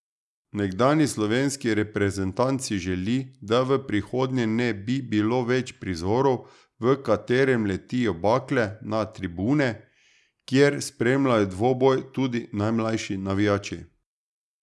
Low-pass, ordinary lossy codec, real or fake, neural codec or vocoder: none; none; real; none